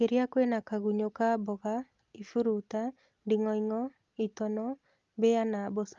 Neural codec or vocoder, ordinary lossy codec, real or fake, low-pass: none; Opus, 32 kbps; real; 7.2 kHz